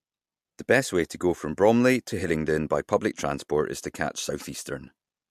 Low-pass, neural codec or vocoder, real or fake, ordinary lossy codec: 14.4 kHz; none; real; MP3, 64 kbps